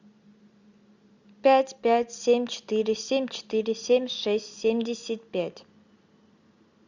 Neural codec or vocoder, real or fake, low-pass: none; real; 7.2 kHz